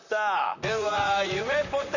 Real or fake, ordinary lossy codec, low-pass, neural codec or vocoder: fake; none; 7.2 kHz; vocoder, 44.1 kHz, 128 mel bands, Pupu-Vocoder